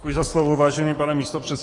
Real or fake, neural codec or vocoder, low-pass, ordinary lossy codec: fake; codec, 44.1 kHz, 7.8 kbps, DAC; 10.8 kHz; AAC, 32 kbps